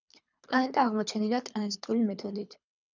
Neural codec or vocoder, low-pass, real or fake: codec, 24 kHz, 3 kbps, HILCodec; 7.2 kHz; fake